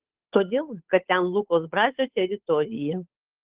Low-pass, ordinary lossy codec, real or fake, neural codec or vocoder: 3.6 kHz; Opus, 16 kbps; fake; codec, 16 kHz, 8 kbps, FunCodec, trained on Chinese and English, 25 frames a second